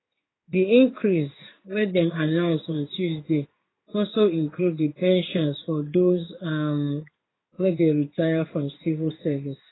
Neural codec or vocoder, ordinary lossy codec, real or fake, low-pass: codec, 16 kHz in and 24 kHz out, 1 kbps, XY-Tokenizer; AAC, 16 kbps; fake; 7.2 kHz